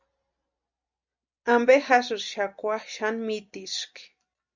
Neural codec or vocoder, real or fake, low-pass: none; real; 7.2 kHz